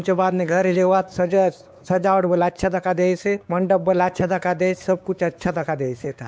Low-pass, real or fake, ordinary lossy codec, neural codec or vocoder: none; fake; none; codec, 16 kHz, 4 kbps, X-Codec, WavLM features, trained on Multilingual LibriSpeech